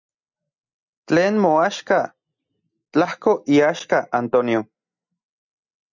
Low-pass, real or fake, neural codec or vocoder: 7.2 kHz; real; none